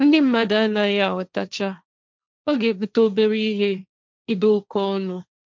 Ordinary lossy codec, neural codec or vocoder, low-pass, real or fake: none; codec, 16 kHz, 1.1 kbps, Voila-Tokenizer; none; fake